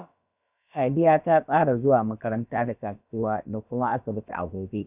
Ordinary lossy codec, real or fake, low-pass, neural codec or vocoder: none; fake; 3.6 kHz; codec, 16 kHz, about 1 kbps, DyCAST, with the encoder's durations